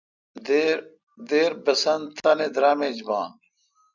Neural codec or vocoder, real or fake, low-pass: none; real; 7.2 kHz